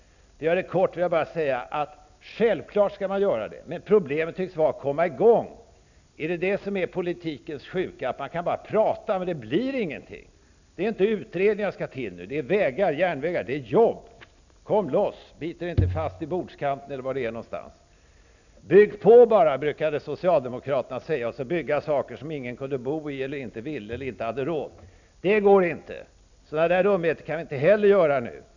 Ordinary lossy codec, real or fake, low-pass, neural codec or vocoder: none; real; 7.2 kHz; none